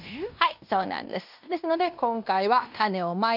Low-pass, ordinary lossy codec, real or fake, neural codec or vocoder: 5.4 kHz; none; fake; codec, 16 kHz in and 24 kHz out, 0.9 kbps, LongCat-Audio-Codec, fine tuned four codebook decoder